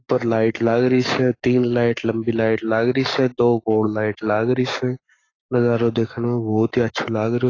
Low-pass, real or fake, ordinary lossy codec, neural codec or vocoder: 7.2 kHz; fake; AAC, 32 kbps; codec, 44.1 kHz, 7.8 kbps, Pupu-Codec